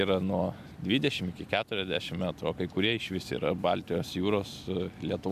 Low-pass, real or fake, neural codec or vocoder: 14.4 kHz; real; none